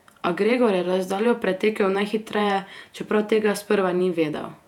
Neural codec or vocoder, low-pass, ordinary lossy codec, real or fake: vocoder, 48 kHz, 128 mel bands, Vocos; 19.8 kHz; none; fake